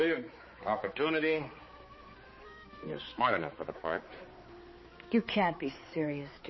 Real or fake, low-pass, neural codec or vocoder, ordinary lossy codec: fake; 7.2 kHz; codec, 16 kHz, 4 kbps, X-Codec, HuBERT features, trained on balanced general audio; MP3, 24 kbps